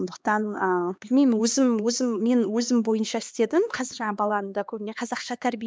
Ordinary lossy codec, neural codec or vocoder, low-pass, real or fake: none; codec, 16 kHz, 2 kbps, X-Codec, HuBERT features, trained on LibriSpeech; none; fake